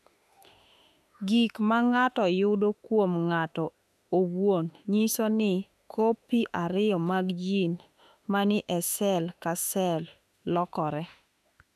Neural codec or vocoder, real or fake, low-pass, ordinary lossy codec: autoencoder, 48 kHz, 32 numbers a frame, DAC-VAE, trained on Japanese speech; fake; 14.4 kHz; none